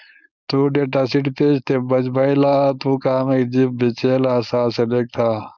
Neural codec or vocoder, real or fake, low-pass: codec, 16 kHz, 4.8 kbps, FACodec; fake; 7.2 kHz